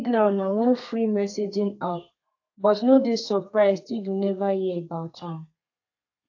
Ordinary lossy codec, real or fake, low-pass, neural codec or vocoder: AAC, 48 kbps; fake; 7.2 kHz; codec, 32 kHz, 1.9 kbps, SNAC